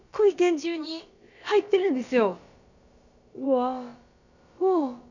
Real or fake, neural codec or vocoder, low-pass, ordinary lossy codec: fake; codec, 16 kHz, about 1 kbps, DyCAST, with the encoder's durations; 7.2 kHz; none